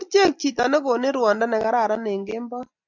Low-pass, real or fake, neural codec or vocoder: 7.2 kHz; real; none